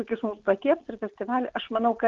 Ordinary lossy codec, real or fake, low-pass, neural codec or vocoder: Opus, 16 kbps; real; 7.2 kHz; none